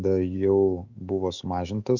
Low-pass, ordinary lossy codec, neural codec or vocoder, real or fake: 7.2 kHz; Opus, 64 kbps; autoencoder, 48 kHz, 128 numbers a frame, DAC-VAE, trained on Japanese speech; fake